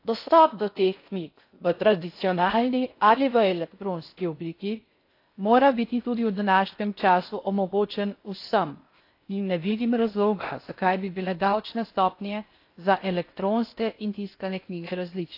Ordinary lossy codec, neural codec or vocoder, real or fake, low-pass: AAC, 32 kbps; codec, 16 kHz in and 24 kHz out, 0.6 kbps, FocalCodec, streaming, 4096 codes; fake; 5.4 kHz